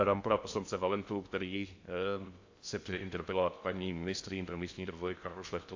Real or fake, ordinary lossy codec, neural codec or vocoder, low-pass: fake; AAC, 48 kbps; codec, 16 kHz in and 24 kHz out, 0.6 kbps, FocalCodec, streaming, 2048 codes; 7.2 kHz